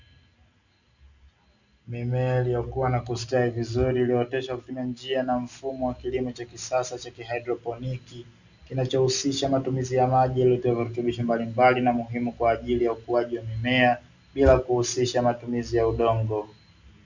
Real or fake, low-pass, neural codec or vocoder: real; 7.2 kHz; none